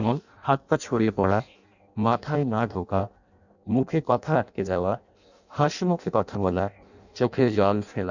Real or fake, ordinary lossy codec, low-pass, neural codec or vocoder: fake; none; 7.2 kHz; codec, 16 kHz in and 24 kHz out, 0.6 kbps, FireRedTTS-2 codec